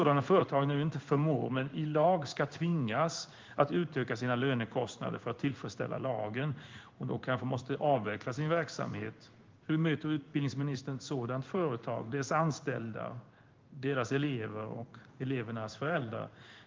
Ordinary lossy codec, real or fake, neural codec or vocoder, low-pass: Opus, 32 kbps; fake; codec, 16 kHz in and 24 kHz out, 1 kbps, XY-Tokenizer; 7.2 kHz